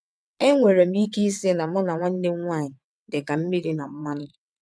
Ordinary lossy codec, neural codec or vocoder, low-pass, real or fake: none; vocoder, 22.05 kHz, 80 mel bands, WaveNeXt; none; fake